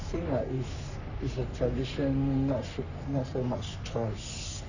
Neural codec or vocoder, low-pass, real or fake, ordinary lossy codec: codec, 44.1 kHz, 3.4 kbps, Pupu-Codec; 7.2 kHz; fake; none